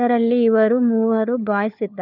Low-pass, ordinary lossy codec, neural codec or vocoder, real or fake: 5.4 kHz; none; codec, 16 kHz, 4 kbps, FunCodec, trained on LibriTTS, 50 frames a second; fake